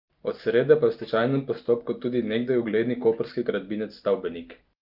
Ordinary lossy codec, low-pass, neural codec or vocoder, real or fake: Opus, 24 kbps; 5.4 kHz; none; real